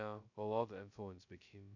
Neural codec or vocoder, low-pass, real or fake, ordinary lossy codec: codec, 16 kHz, 0.2 kbps, FocalCodec; 7.2 kHz; fake; none